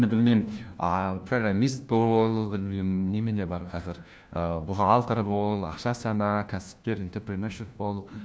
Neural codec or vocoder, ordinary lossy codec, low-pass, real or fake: codec, 16 kHz, 1 kbps, FunCodec, trained on LibriTTS, 50 frames a second; none; none; fake